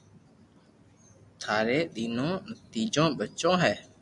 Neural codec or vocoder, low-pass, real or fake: none; 10.8 kHz; real